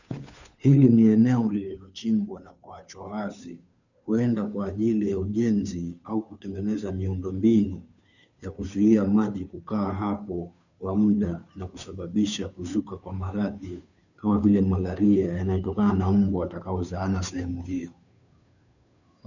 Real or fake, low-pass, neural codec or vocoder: fake; 7.2 kHz; codec, 16 kHz, 2 kbps, FunCodec, trained on Chinese and English, 25 frames a second